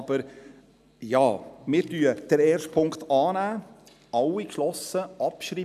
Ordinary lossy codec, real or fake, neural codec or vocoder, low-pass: none; real; none; 14.4 kHz